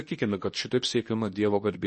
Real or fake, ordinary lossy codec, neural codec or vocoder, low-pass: fake; MP3, 32 kbps; codec, 24 kHz, 0.9 kbps, WavTokenizer, medium speech release version 2; 10.8 kHz